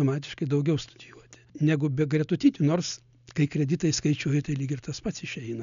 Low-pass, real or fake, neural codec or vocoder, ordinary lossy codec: 7.2 kHz; real; none; MP3, 96 kbps